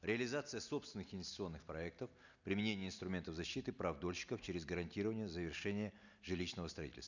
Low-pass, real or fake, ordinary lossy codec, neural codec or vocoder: 7.2 kHz; real; none; none